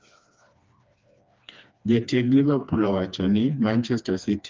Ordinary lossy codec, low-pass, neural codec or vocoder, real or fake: Opus, 24 kbps; 7.2 kHz; codec, 16 kHz, 2 kbps, FreqCodec, smaller model; fake